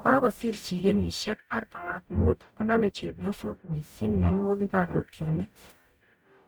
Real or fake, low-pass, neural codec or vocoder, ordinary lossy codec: fake; none; codec, 44.1 kHz, 0.9 kbps, DAC; none